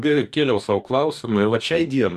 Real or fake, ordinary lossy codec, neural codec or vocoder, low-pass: fake; MP3, 96 kbps; codec, 44.1 kHz, 2.6 kbps, DAC; 14.4 kHz